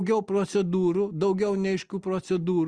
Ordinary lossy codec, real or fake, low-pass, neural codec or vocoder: Opus, 32 kbps; real; 9.9 kHz; none